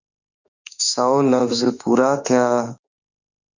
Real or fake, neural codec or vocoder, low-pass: fake; autoencoder, 48 kHz, 32 numbers a frame, DAC-VAE, trained on Japanese speech; 7.2 kHz